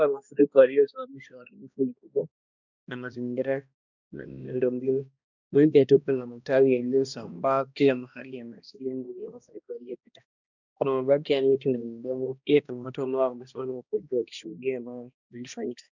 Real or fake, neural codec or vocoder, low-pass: fake; codec, 16 kHz, 1 kbps, X-Codec, HuBERT features, trained on balanced general audio; 7.2 kHz